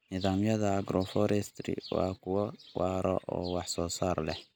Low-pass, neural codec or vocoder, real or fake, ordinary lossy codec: none; none; real; none